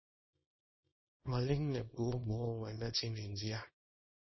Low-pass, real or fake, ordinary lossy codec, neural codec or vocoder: 7.2 kHz; fake; MP3, 24 kbps; codec, 24 kHz, 0.9 kbps, WavTokenizer, small release